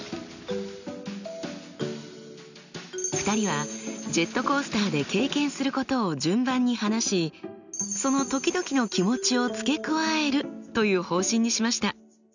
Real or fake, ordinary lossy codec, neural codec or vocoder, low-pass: real; none; none; 7.2 kHz